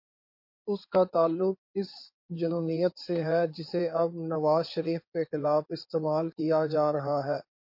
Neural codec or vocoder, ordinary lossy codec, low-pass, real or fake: codec, 16 kHz in and 24 kHz out, 2.2 kbps, FireRedTTS-2 codec; MP3, 32 kbps; 5.4 kHz; fake